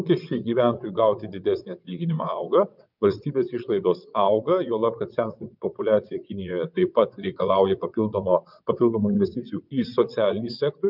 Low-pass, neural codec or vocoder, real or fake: 5.4 kHz; vocoder, 22.05 kHz, 80 mel bands, WaveNeXt; fake